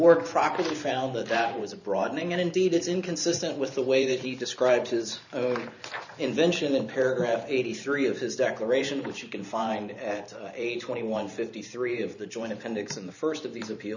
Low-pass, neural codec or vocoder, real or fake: 7.2 kHz; vocoder, 44.1 kHz, 128 mel bands every 512 samples, BigVGAN v2; fake